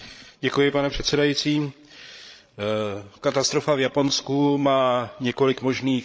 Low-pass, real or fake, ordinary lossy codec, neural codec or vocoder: none; fake; none; codec, 16 kHz, 16 kbps, FreqCodec, larger model